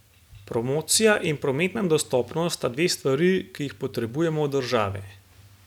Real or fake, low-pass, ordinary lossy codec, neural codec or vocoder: real; 19.8 kHz; none; none